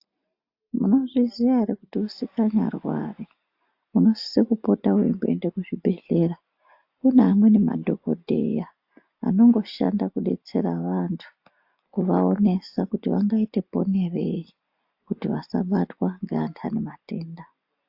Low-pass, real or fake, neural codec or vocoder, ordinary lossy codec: 5.4 kHz; real; none; AAC, 48 kbps